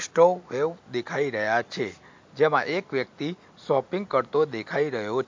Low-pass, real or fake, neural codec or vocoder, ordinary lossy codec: 7.2 kHz; real; none; MP3, 48 kbps